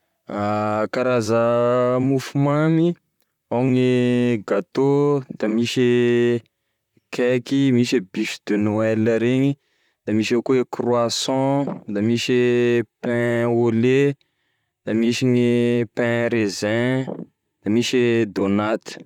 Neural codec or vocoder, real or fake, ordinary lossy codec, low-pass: vocoder, 44.1 kHz, 128 mel bands, Pupu-Vocoder; fake; none; 19.8 kHz